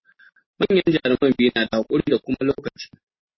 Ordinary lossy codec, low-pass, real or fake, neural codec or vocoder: MP3, 24 kbps; 7.2 kHz; real; none